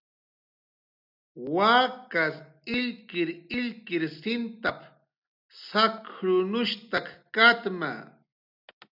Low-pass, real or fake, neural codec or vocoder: 5.4 kHz; real; none